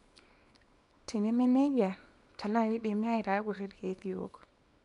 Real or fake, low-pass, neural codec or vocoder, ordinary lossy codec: fake; 10.8 kHz; codec, 24 kHz, 0.9 kbps, WavTokenizer, small release; none